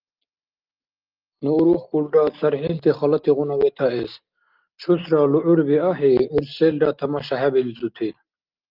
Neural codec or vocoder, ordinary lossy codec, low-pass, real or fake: none; Opus, 32 kbps; 5.4 kHz; real